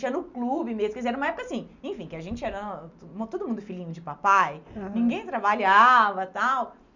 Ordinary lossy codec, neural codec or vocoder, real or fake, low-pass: none; none; real; 7.2 kHz